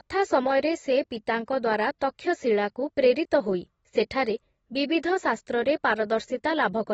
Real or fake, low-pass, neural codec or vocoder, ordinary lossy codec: real; 19.8 kHz; none; AAC, 24 kbps